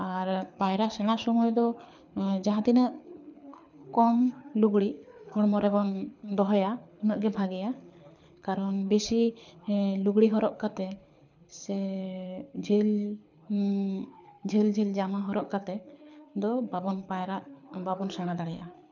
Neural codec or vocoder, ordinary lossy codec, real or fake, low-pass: codec, 24 kHz, 6 kbps, HILCodec; none; fake; 7.2 kHz